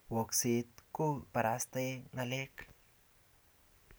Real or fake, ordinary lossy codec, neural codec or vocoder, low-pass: real; none; none; none